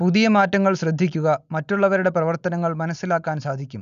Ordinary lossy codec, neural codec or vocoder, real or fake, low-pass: none; none; real; 7.2 kHz